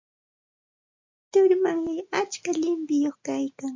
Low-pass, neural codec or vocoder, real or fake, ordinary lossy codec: 7.2 kHz; none; real; MP3, 48 kbps